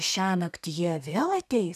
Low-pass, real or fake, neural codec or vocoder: 14.4 kHz; fake; codec, 32 kHz, 1.9 kbps, SNAC